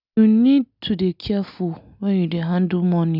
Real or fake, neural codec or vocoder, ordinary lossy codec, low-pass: real; none; none; 5.4 kHz